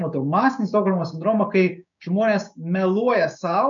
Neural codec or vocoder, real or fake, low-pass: codec, 16 kHz, 6 kbps, DAC; fake; 7.2 kHz